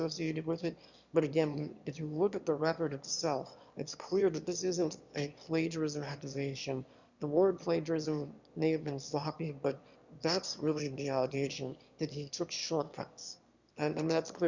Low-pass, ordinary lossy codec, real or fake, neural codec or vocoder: 7.2 kHz; Opus, 64 kbps; fake; autoencoder, 22.05 kHz, a latent of 192 numbers a frame, VITS, trained on one speaker